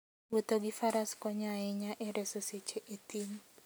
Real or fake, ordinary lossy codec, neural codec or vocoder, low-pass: real; none; none; none